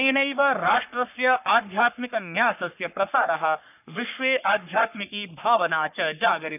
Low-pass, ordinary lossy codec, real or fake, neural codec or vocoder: 3.6 kHz; none; fake; codec, 44.1 kHz, 3.4 kbps, Pupu-Codec